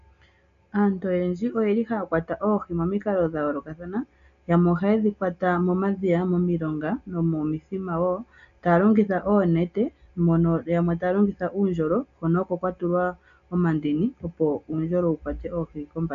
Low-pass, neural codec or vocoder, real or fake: 7.2 kHz; none; real